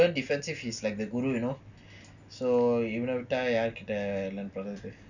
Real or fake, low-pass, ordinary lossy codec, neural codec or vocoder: real; 7.2 kHz; none; none